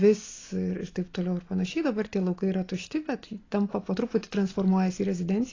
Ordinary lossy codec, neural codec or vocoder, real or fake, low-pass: AAC, 32 kbps; none; real; 7.2 kHz